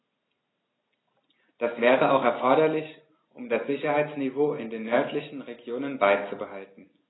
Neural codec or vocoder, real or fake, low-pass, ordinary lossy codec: none; real; 7.2 kHz; AAC, 16 kbps